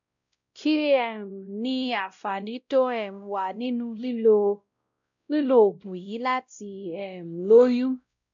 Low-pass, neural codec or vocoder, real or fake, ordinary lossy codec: 7.2 kHz; codec, 16 kHz, 0.5 kbps, X-Codec, WavLM features, trained on Multilingual LibriSpeech; fake; none